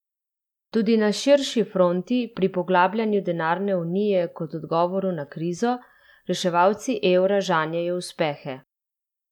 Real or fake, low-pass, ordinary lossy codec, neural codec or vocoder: real; 19.8 kHz; none; none